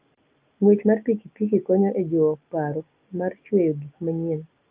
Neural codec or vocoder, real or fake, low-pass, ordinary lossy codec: none; real; 3.6 kHz; Opus, 32 kbps